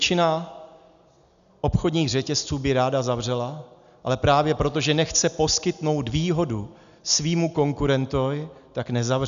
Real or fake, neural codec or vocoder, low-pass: real; none; 7.2 kHz